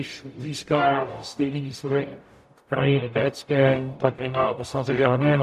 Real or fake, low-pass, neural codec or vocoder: fake; 14.4 kHz; codec, 44.1 kHz, 0.9 kbps, DAC